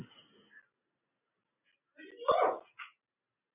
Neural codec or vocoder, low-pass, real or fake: none; 3.6 kHz; real